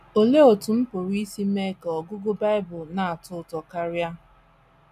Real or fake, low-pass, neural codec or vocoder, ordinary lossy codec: real; 14.4 kHz; none; none